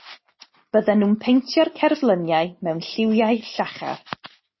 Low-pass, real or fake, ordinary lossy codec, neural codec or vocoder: 7.2 kHz; real; MP3, 24 kbps; none